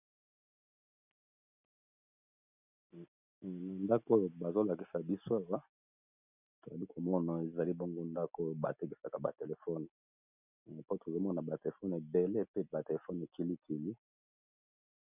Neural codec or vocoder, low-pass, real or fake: none; 3.6 kHz; real